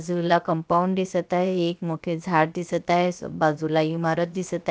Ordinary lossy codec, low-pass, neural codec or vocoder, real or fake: none; none; codec, 16 kHz, about 1 kbps, DyCAST, with the encoder's durations; fake